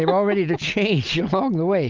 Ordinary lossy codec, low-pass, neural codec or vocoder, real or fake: Opus, 24 kbps; 7.2 kHz; none; real